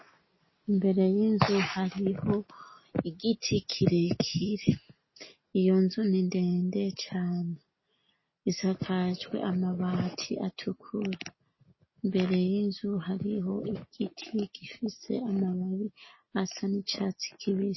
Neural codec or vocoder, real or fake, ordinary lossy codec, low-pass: none; real; MP3, 24 kbps; 7.2 kHz